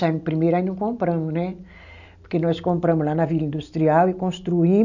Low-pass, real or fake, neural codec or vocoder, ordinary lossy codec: 7.2 kHz; real; none; none